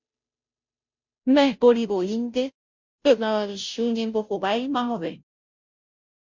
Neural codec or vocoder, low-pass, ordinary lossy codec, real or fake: codec, 16 kHz, 0.5 kbps, FunCodec, trained on Chinese and English, 25 frames a second; 7.2 kHz; MP3, 64 kbps; fake